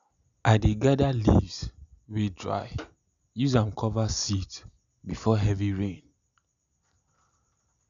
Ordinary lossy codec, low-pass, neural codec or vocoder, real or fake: none; 7.2 kHz; none; real